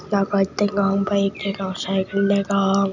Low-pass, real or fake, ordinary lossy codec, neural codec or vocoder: 7.2 kHz; real; none; none